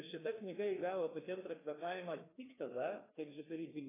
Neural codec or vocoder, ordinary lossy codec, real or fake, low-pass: codec, 16 kHz, 2 kbps, FreqCodec, larger model; AAC, 16 kbps; fake; 3.6 kHz